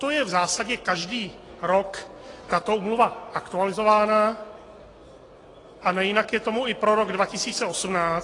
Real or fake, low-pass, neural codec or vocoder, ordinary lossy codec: real; 10.8 kHz; none; AAC, 32 kbps